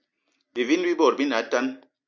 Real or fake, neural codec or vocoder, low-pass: real; none; 7.2 kHz